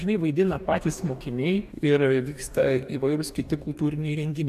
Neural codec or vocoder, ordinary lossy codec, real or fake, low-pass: codec, 44.1 kHz, 2.6 kbps, DAC; AAC, 96 kbps; fake; 14.4 kHz